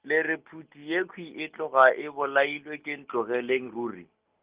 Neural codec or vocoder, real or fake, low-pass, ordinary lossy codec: none; real; 3.6 kHz; Opus, 32 kbps